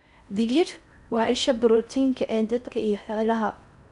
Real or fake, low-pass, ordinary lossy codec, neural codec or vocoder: fake; 10.8 kHz; none; codec, 16 kHz in and 24 kHz out, 0.6 kbps, FocalCodec, streaming, 4096 codes